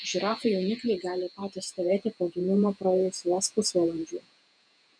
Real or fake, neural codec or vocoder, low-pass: fake; vocoder, 48 kHz, 128 mel bands, Vocos; 9.9 kHz